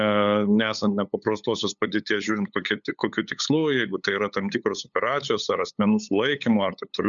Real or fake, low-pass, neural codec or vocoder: fake; 7.2 kHz; codec, 16 kHz, 8 kbps, FunCodec, trained on LibriTTS, 25 frames a second